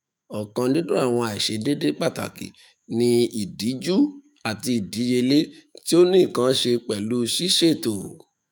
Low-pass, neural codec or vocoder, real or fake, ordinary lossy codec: none; autoencoder, 48 kHz, 128 numbers a frame, DAC-VAE, trained on Japanese speech; fake; none